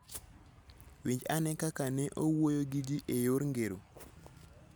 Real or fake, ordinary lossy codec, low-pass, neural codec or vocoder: real; none; none; none